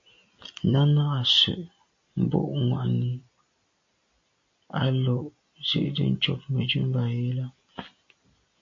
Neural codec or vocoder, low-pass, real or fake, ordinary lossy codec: none; 7.2 kHz; real; AAC, 48 kbps